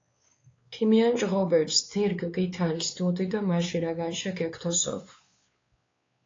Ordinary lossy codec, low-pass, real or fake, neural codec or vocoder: AAC, 32 kbps; 7.2 kHz; fake; codec, 16 kHz, 4 kbps, X-Codec, WavLM features, trained on Multilingual LibriSpeech